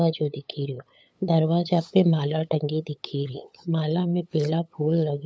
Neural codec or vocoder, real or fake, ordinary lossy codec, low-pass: codec, 16 kHz, 8 kbps, FunCodec, trained on LibriTTS, 25 frames a second; fake; none; none